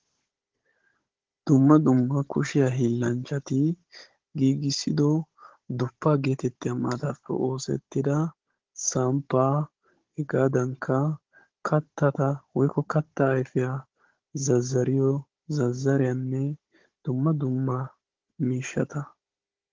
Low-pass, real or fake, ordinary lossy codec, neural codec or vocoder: 7.2 kHz; fake; Opus, 16 kbps; codec, 16 kHz, 16 kbps, FunCodec, trained on Chinese and English, 50 frames a second